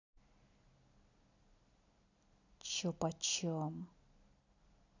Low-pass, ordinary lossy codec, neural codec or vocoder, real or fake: 7.2 kHz; none; none; real